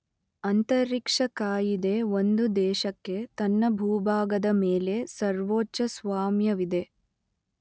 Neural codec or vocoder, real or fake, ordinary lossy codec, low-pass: none; real; none; none